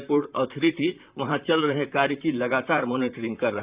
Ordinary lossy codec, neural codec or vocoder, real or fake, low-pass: Opus, 64 kbps; vocoder, 44.1 kHz, 128 mel bands, Pupu-Vocoder; fake; 3.6 kHz